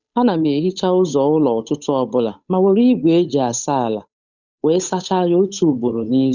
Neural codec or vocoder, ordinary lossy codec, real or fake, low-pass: codec, 16 kHz, 8 kbps, FunCodec, trained on Chinese and English, 25 frames a second; none; fake; 7.2 kHz